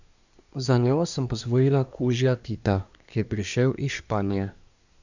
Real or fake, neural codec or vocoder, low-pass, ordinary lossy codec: fake; codec, 24 kHz, 1 kbps, SNAC; 7.2 kHz; none